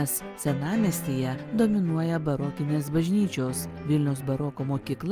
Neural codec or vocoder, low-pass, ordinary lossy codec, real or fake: none; 14.4 kHz; Opus, 32 kbps; real